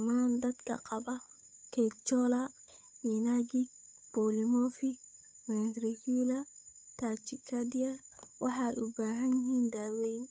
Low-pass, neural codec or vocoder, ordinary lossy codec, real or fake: none; codec, 16 kHz, 8 kbps, FunCodec, trained on Chinese and English, 25 frames a second; none; fake